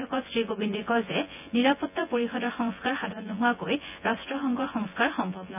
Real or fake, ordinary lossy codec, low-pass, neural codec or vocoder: fake; none; 3.6 kHz; vocoder, 24 kHz, 100 mel bands, Vocos